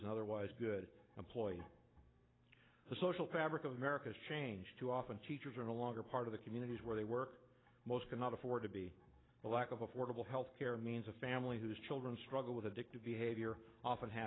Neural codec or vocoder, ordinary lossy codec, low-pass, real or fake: none; AAC, 16 kbps; 7.2 kHz; real